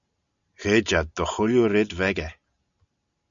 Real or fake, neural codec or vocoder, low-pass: real; none; 7.2 kHz